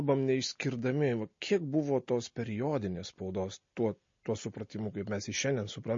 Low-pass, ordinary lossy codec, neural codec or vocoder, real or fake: 7.2 kHz; MP3, 32 kbps; none; real